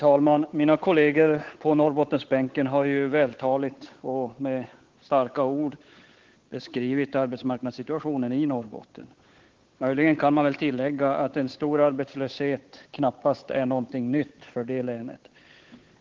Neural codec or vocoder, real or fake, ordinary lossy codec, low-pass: codec, 16 kHz, 8 kbps, FunCodec, trained on Chinese and English, 25 frames a second; fake; Opus, 16 kbps; 7.2 kHz